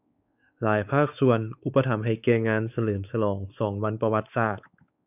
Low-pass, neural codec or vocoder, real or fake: 3.6 kHz; codec, 16 kHz, 4 kbps, X-Codec, WavLM features, trained on Multilingual LibriSpeech; fake